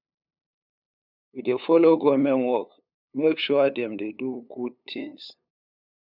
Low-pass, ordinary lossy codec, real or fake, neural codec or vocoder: 5.4 kHz; none; fake; codec, 16 kHz, 8 kbps, FunCodec, trained on LibriTTS, 25 frames a second